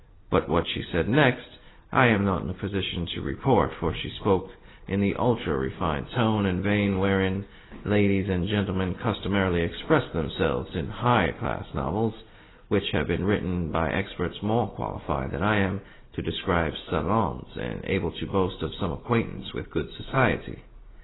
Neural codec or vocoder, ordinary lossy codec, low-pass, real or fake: none; AAC, 16 kbps; 7.2 kHz; real